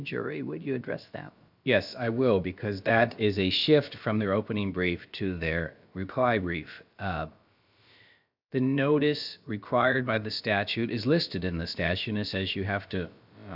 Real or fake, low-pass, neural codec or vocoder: fake; 5.4 kHz; codec, 16 kHz, about 1 kbps, DyCAST, with the encoder's durations